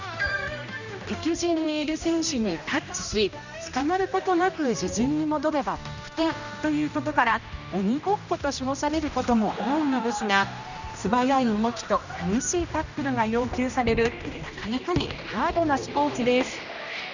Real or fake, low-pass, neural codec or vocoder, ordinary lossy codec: fake; 7.2 kHz; codec, 16 kHz, 1 kbps, X-Codec, HuBERT features, trained on general audio; none